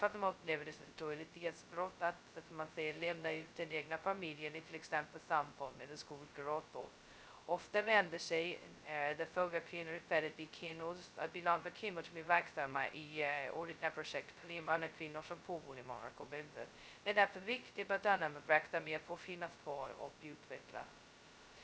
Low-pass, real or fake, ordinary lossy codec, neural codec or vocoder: none; fake; none; codec, 16 kHz, 0.2 kbps, FocalCodec